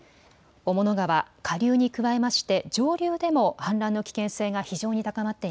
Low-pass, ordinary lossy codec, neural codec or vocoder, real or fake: none; none; none; real